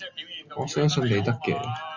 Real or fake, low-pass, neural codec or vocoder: real; 7.2 kHz; none